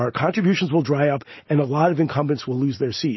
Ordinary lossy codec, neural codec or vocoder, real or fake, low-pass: MP3, 24 kbps; none; real; 7.2 kHz